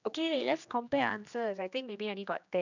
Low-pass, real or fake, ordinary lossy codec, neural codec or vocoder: 7.2 kHz; fake; none; codec, 16 kHz, 2 kbps, X-Codec, HuBERT features, trained on general audio